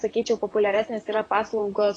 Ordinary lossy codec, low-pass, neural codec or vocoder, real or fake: AAC, 32 kbps; 9.9 kHz; vocoder, 44.1 kHz, 128 mel bands, Pupu-Vocoder; fake